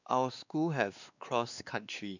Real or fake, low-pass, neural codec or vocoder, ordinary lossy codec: fake; 7.2 kHz; codec, 16 kHz, 2 kbps, X-Codec, WavLM features, trained on Multilingual LibriSpeech; none